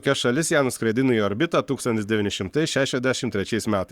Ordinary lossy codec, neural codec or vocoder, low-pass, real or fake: Opus, 64 kbps; codec, 44.1 kHz, 7.8 kbps, Pupu-Codec; 19.8 kHz; fake